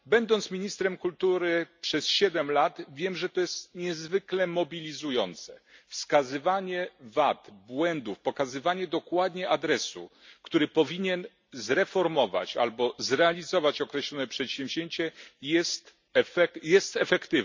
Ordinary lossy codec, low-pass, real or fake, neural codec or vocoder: MP3, 64 kbps; 7.2 kHz; real; none